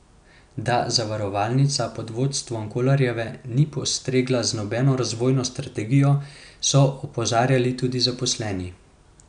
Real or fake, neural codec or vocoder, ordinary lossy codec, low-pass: real; none; none; 9.9 kHz